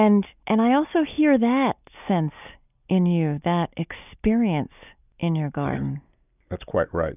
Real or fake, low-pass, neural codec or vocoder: real; 3.6 kHz; none